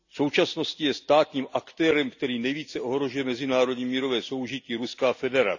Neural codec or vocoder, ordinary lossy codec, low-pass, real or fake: none; none; 7.2 kHz; real